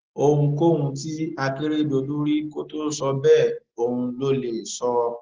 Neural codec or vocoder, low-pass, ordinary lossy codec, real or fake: none; 7.2 kHz; Opus, 16 kbps; real